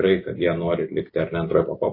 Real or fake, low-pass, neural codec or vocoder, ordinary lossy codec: real; 5.4 kHz; none; MP3, 24 kbps